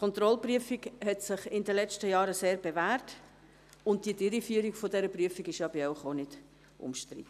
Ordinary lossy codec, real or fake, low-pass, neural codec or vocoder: none; real; 14.4 kHz; none